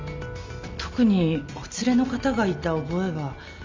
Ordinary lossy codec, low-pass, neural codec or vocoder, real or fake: MP3, 64 kbps; 7.2 kHz; none; real